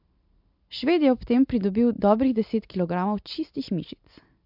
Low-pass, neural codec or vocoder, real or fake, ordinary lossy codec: 5.4 kHz; none; real; MP3, 48 kbps